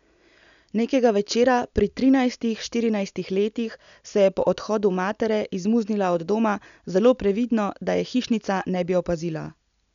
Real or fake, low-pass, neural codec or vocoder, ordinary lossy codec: real; 7.2 kHz; none; none